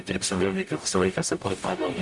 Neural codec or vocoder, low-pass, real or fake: codec, 44.1 kHz, 0.9 kbps, DAC; 10.8 kHz; fake